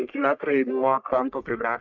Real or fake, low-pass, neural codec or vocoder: fake; 7.2 kHz; codec, 44.1 kHz, 1.7 kbps, Pupu-Codec